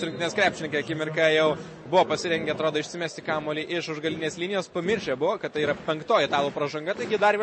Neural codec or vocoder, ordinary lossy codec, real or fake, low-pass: none; MP3, 32 kbps; real; 9.9 kHz